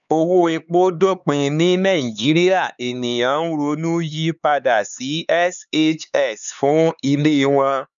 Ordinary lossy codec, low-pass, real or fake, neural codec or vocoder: none; 7.2 kHz; fake; codec, 16 kHz, 4 kbps, X-Codec, HuBERT features, trained on LibriSpeech